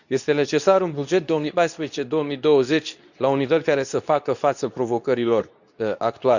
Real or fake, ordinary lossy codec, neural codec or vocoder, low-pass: fake; none; codec, 24 kHz, 0.9 kbps, WavTokenizer, medium speech release version 2; 7.2 kHz